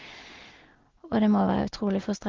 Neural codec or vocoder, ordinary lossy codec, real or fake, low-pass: none; Opus, 16 kbps; real; 7.2 kHz